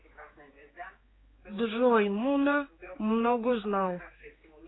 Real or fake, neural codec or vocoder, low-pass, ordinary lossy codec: fake; codec, 16 kHz, 4 kbps, X-Codec, HuBERT features, trained on general audio; 7.2 kHz; AAC, 16 kbps